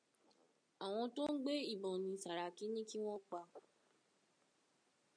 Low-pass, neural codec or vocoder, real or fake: 9.9 kHz; none; real